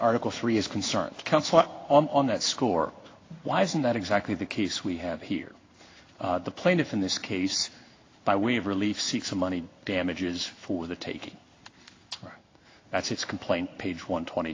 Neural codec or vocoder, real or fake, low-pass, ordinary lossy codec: codec, 16 kHz in and 24 kHz out, 1 kbps, XY-Tokenizer; fake; 7.2 kHz; AAC, 32 kbps